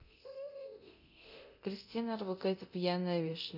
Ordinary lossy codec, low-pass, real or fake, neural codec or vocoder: none; 5.4 kHz; fake; codec, 24 kHz, 0.9 kbps, DualCodec